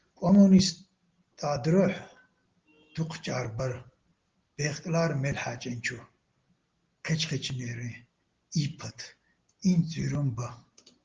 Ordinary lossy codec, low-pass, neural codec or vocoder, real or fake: Opus, 16 kbps; 7.2 kHz; none; real